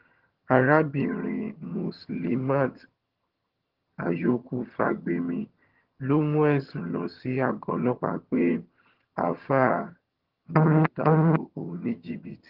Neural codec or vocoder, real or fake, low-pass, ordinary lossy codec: vocoder, 22.05 kHz, 80 mel bands, HiFi-GAN; fake; 5.4 kHz; Opus, 16 kbps